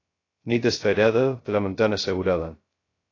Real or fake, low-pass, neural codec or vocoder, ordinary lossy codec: fake; 7.2 kHz; codec, 16 kHz, 0.2 kbps, FocalCodec; AAC, 32 kbps